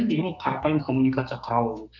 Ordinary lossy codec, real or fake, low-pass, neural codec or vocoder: none; fake; 7.2 kHz; codec, 32 kHz, 1.9 kbps, SNAC